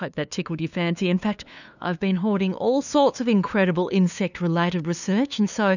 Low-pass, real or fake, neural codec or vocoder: 7.2 kHz; fake; codec, 16 kHz, 2 kbps, FunCodec, trained on LibriTTS, 25 frames a second